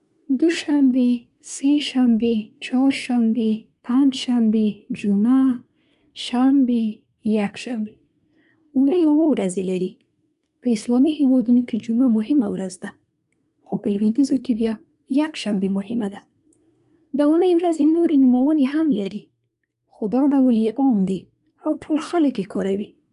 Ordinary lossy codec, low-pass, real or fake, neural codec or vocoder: none; 10.8 kHz; fake; codec, 24 kHz, 1 kbps, SNAC